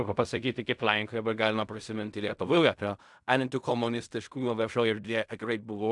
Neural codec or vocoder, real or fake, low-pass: codec, 16 kHz in and 24 kHz out, 0.4 kbps, LongCat-Audio-Codec, fine tuned four codebook decoder; fake; 10.8 kHz